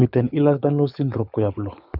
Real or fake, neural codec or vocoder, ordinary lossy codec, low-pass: fake; codec, 24 kHz, 6 kbps, HILCodec; none; 5.4 kHz